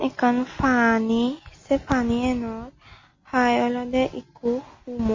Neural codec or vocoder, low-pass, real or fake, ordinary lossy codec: none; 7.2 kHz; real; MP3, 32 kbps